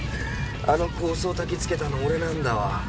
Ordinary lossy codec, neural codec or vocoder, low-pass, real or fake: none; none; none; real